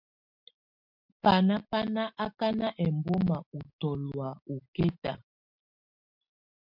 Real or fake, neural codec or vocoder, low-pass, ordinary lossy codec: real; none; 5.4 kHz; MP3, 48 kbps